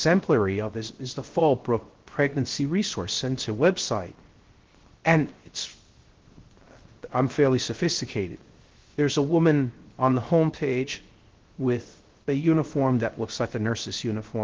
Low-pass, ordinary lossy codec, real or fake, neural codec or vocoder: 7.2 kHz; Opus, 16 kbps; fake; codec, 16 kHz, 0.3 kbps, FocalCodec